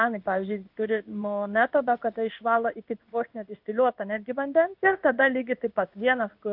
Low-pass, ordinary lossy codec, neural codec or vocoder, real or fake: 5.4 kHz; AAC, 48 kbps; codec, 16 kHz in and 24 kHz out, 1 kbps, XY-Tokenizer; fake